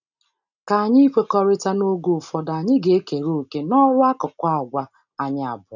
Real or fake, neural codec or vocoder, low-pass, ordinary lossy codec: real; none; 7.2 kHz; none